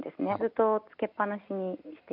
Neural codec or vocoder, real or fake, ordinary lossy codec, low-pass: none; real; none; 5.4 kHz